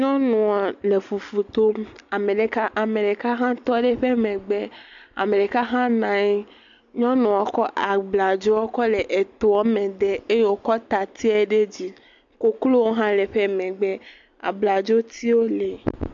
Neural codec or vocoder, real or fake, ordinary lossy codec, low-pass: none; real; AAC, 64 kbps; 7.2 kHz